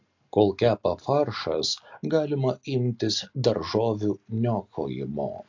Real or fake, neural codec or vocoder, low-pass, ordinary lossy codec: real; none; 7.2 kHz; AAC, 48 kbps